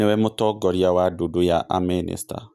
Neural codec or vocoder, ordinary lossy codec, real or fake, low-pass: none; none; real; 14.4 kHz